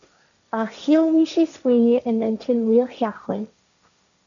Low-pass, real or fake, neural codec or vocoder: 7.2 kHz; fake; codec, 16 kHz, 1.1 kbps, Voila-Tokenizer